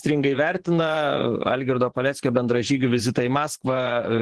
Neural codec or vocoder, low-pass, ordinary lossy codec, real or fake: vocoder, 24 kHz, 100 mel bands, Vocos; 10.8 kHz; Opus, 16 kbps; fake